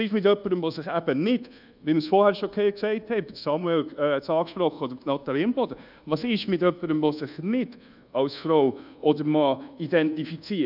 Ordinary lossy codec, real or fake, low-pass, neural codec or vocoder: none; fake; 5.4 kHz; codec, 24 kHz, 1.2 kbps, DualCodec